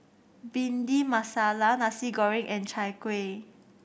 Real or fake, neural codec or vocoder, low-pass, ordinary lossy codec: real; none; none; none